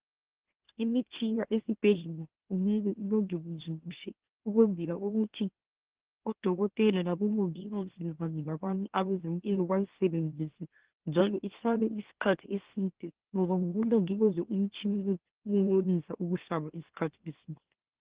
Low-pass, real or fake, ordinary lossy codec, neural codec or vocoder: 3.6 kHz; fake; Opus, 16 kbps; autoencoder, 44.1 kHz, a latent of 192 numbers a frame, MeloTTS